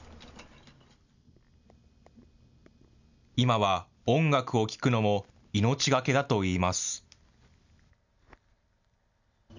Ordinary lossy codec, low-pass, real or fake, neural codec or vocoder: none; 7.2 kHz; real; none